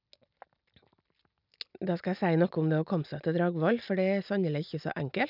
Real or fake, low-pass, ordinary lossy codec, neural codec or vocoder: real; 5.4 kHz; none; none